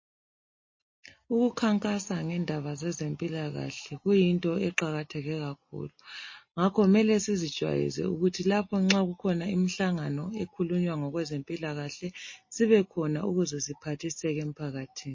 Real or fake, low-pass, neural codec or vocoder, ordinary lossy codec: real; 7.2 kHz; none; MP3, 32 kbps